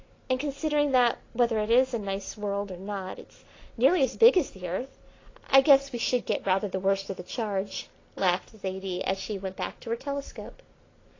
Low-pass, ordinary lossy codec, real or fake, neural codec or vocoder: 7.2 kHz; AAC, 32 kbps; real; none